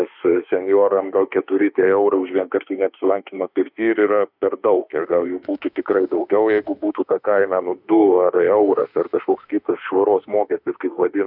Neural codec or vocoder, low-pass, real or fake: autoencoder, 48 kHz, 32 numbers a frame, DAC-VAE, trained on Japanese speech; 5.4 kHz; fake